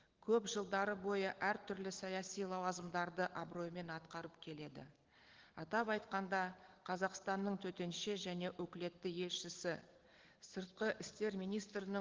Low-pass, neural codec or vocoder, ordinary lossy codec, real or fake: 7.2 kHz; none; Opus, 16 kbps; real